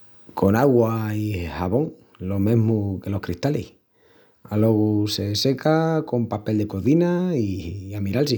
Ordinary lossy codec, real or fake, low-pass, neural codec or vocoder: none; real; none; none